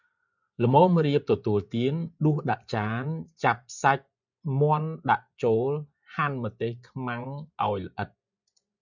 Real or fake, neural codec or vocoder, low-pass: fake; vocoder, 24 kHz, 100 mel bands, Vocos; 7.2 kHz